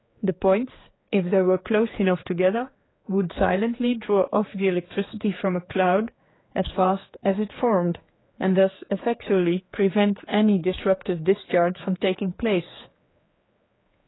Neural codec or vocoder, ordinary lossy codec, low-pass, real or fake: codec, 16 kHz, 4 kbps, X-Codec, HuBERT features, trained on general audio; AAC, 16 kbps; 7.2 kHz; fake